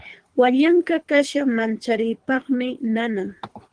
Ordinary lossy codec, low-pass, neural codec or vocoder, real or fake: Opus, 24 kbps; 9.9 kHz; codec, 24 kHz, 3 kbps, HILCodec; fake